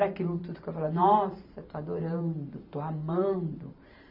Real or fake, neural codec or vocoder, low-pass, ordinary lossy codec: fake; vocoder, 44.1 kHz, 128 mel bands every 512 samples, BigVGAN v2; 5.4 kHz; AAC, 48 kbps